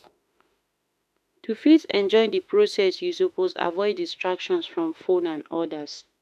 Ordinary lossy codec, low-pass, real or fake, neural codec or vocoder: none; 14.4 kHz; fake; autoencoder, 48 kHz, 32 numbers a frame, DAC-VAE, trained on Japanese speech